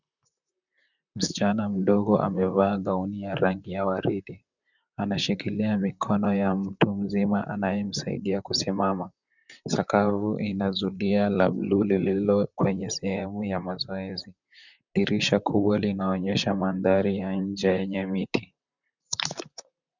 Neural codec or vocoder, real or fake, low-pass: vocoder, 44.1 kHz, 128 mel bands, Pupu-Vocoder; fake; 7.2 kHz